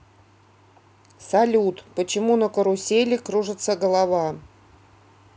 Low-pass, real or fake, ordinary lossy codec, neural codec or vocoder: none; real; none; none